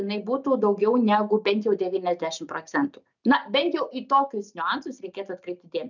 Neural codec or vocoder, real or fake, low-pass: none; real; 7.2 kHz